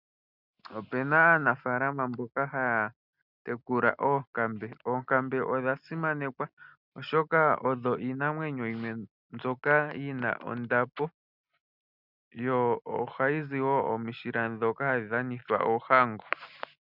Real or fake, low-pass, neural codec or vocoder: real; 5.4 kHz; none